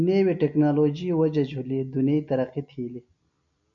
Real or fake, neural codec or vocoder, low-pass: real; none; 7.2 kHz